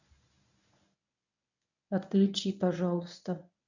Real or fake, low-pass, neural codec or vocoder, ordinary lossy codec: fake; 7.2 kHz; codec, 24 kHz, 0.9 kbps, WavTokenizer, medium speech release version 1; none